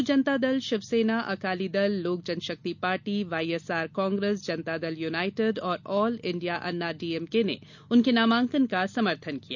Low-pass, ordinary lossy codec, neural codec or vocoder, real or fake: 7.2 kHz; none; none; real